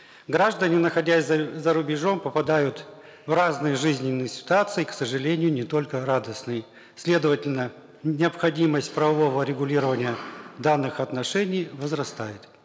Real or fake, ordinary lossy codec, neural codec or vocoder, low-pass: real; none; none; none